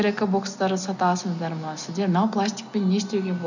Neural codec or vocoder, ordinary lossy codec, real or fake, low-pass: none; none; real; 7.2 kHz